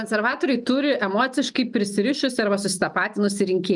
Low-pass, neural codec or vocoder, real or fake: 10.8 kHz; none; real